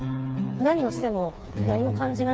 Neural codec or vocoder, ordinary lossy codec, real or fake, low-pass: codec, 16 kHz, 4 kbps, FreqCodec, smaller model; none; fake; none